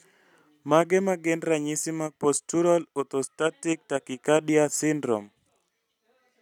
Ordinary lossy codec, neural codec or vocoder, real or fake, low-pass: none; none; real; 19.8 kHz